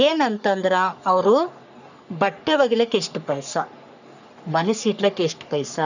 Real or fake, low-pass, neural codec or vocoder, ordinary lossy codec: fake; 7.2 kHz; codec, 44.1 kHz, 3.4 kbps, Pupu-Codec; none